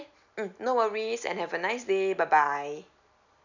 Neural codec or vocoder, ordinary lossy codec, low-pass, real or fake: none; none; 7.2 kHz; real